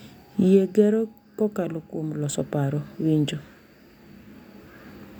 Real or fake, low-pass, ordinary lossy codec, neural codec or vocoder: real; 19.8 kHz; none; none